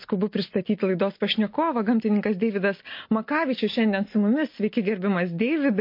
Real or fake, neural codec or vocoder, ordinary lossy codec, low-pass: real; none; MP3, 32 kbps; 5.4 kHz